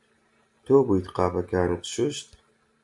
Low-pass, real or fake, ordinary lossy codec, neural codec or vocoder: 10.8 kHz; real; MP3, 64 kbps; none